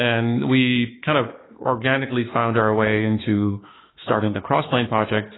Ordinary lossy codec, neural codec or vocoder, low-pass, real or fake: AAC, 16 kbps; autoencoder, 48 kHz, 32 numbers a frame, DAC-VAE, trained on Japanese speech; 7.2 kHz; fake